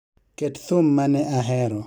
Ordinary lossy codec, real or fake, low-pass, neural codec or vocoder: none; fake; none; vocoder, 44.1 kHz, 128 mel bands every 256 samples, BigVGAN v2